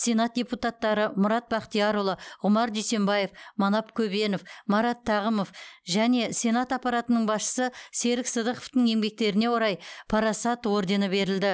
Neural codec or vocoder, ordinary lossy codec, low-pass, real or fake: none; none; none; real